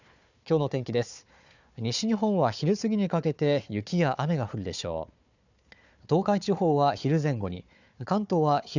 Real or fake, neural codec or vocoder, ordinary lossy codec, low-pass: fake; codec, 16 kHz, 4 kbps, FunCodec, trained on Chinese and English, 50 frames a second; none; 7.2 kHz